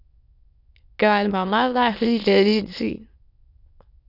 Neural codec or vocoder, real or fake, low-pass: autoencoder, 22.05 kHz, a latent of 192 numbers a frame, VITS, trained on many speakers; fake; 5.4 kHz